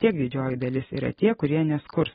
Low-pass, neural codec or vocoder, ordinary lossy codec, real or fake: 14.4 kHz; none; AAC, 16 kbps; real